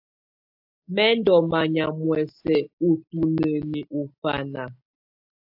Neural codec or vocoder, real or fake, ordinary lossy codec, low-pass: none; real; AAC, 48 kbps; 5.4 kHz